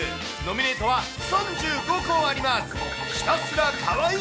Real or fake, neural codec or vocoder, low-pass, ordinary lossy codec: real; none; none; none